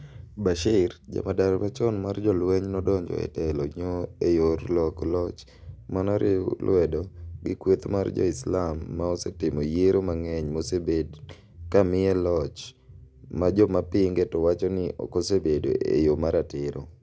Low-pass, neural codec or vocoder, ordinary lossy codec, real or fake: none; none; none; real